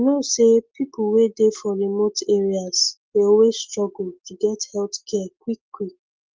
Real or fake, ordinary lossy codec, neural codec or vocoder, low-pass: real; Opus, 24 kbps; none; 7.2 kHz